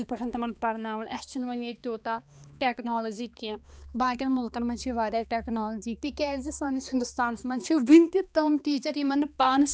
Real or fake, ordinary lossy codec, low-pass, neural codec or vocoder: fake; none; none; codec, 16 kHz, 2 kbps, X-Codec, HuBERT features, trained on balanced general audio